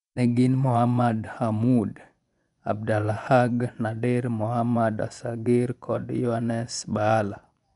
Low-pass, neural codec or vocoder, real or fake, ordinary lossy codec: 10.8 kHz; vocoder, 24 kHz, 100 mel bands, Vocos; fake; none